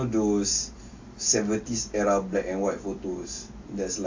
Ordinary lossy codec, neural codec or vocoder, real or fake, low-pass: AAC, 48 kbps; none; real; 7.2 kHz